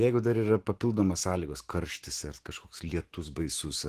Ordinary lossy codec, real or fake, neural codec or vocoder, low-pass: Opus, 16 kbps; fake; autoencoder, 48 kHz, 128 numbers a frame, DAC-VAE, trained on Japanese speech; 14.4 kHz